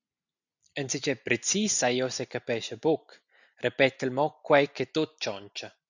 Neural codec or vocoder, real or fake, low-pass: none; real; 7.2 kHz